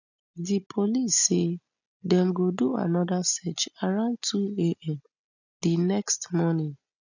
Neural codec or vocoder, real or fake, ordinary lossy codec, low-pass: none; real; none; 7.2 kHz